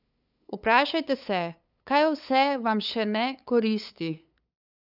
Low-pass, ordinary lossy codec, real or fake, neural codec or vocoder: 5.4 kHz; none; fake; codec, 16 kHz, 8 kbps, FunCodec, trained on LibriTTS, 25 frames a second